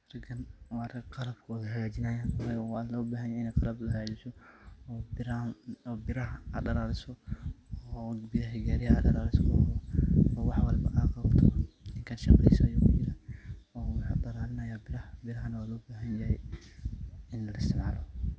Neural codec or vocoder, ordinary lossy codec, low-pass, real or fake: none; none; none; real